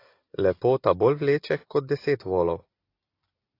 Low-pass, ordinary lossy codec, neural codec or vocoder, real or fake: 5.4 kHz; AAC, 32 kbps; none; real